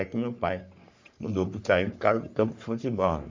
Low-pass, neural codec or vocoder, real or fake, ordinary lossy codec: 7.2 kHz; codec, 44.1 kHz, 3.4 kbps, Pupu-Codec; fake; MP3, 64 kbps